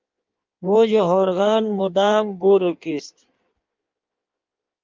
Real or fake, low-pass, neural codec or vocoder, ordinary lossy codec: fake; 7.2 kHz; codec, 16 kHz in and 24 kHz out, 1.1 kbps, FireRedTTS-2 codec; Opus, 24 kbps